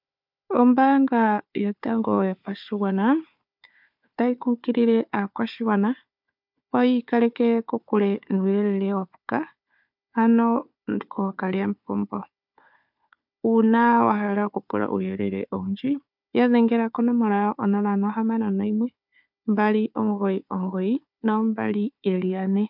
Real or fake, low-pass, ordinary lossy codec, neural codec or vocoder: fake; 5.4 kHz; MP3, 48 kbps; codec, 16 kHz, 4 kbps, FunCodec, trained on Chinese and English, 50 frames a second